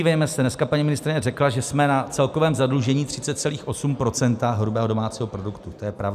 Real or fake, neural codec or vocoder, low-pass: real; none; 14.4 kHz